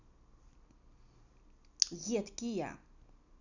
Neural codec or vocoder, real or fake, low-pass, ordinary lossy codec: none; real; 7.2 kHz; none